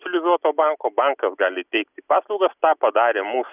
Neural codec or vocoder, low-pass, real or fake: none; 3.6 kHz; real